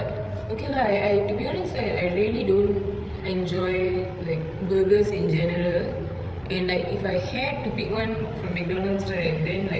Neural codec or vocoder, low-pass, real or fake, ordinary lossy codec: codec, 16 kHz, 8 kbps, FreqCodec, larger model; none; fake; none